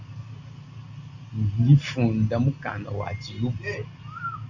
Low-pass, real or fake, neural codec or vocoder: 7.2 kHz; real; none